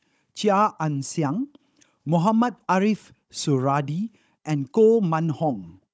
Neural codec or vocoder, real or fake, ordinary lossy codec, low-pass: codec, 16 kHz, 16 kbps, FunCodec, trained on Chinese and English, 50 frames a second; fake; none; none